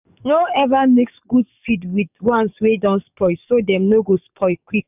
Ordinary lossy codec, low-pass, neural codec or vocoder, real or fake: none; 3.6 kHz; codec, 44.1 kHz, 7.8 kbps, DAC; fake